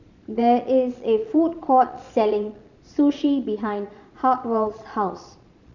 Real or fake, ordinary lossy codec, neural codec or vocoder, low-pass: fake; none; vocoder, 22.05 kHz, 80 mel bands, Vocos; 7.2 kHz